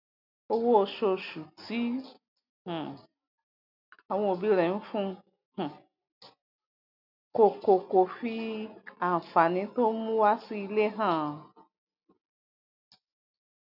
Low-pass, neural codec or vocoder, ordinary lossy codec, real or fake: 5.4 kHz; none; none; real